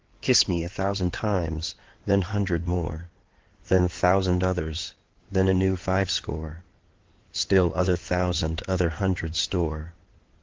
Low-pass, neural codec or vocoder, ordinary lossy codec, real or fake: 7.2 kHz; codec, 16 kHz in and 24 kHz out, 2.2 kbps, FireRedTTS-2 codec; Opus, 16 kbps; fake